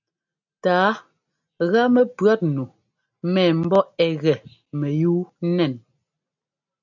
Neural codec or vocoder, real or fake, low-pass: none; real; 7.2 kHz